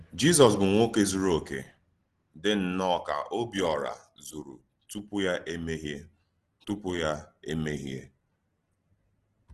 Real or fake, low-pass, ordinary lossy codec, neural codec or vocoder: real; 10.8 kHz; Opus, 16 kbps; none